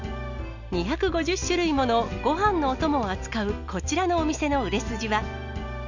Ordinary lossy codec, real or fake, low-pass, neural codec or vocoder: none; real; 7.2 kHz; none